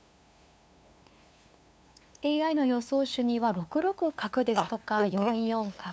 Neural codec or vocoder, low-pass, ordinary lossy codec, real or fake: codec, 16 kHz, 2 kbps, FunCodec, trained on LibriTTS, 25 frames a second; none; none; fake